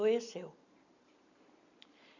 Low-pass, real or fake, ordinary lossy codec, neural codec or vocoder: 7.2 kHz; real; none; none